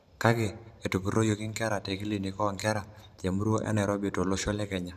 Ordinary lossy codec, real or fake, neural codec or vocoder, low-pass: none; fake; vocoder, 44.1 kHz, 128 mel bands every 512 samples, BigVGAN v2; 14.4 kHz